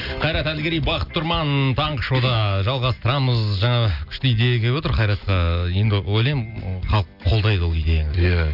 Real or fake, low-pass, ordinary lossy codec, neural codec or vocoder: real; 5.4 kHz; none; none